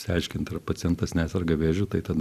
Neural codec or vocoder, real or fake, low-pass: vocoder, 44.1 kHz, 128 mel bands every 256 samples, BigVGAN v2; fake; 14.4 kHz